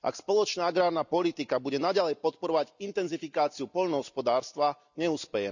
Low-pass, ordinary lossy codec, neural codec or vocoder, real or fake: 7.2 kHz; none; none; real